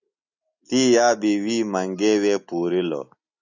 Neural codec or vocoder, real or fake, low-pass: none; real; 7.2 kHz